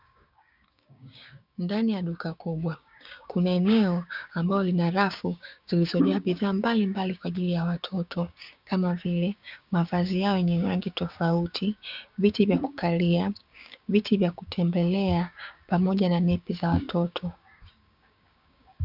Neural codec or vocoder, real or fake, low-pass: codec, 16 kHz, 6 kbps, DAC; fake; 5.4 kHz